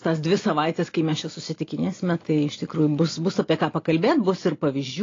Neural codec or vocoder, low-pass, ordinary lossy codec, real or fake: none; 7.2 kHz; AAC, 32 kbps; real